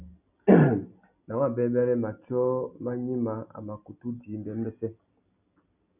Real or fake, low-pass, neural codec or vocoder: real; 3.6 kHz; none